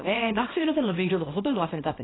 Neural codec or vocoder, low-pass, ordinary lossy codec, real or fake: codec, 24 kHz, 0.9 kbps, WavTokenizer, small release; 7.2 kHz; AAC, 16 kbps; fake